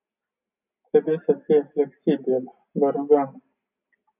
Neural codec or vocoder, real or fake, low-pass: none; real; 3.6 kHz